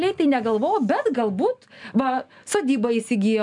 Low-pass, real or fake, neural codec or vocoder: 10.8 kHz; real; none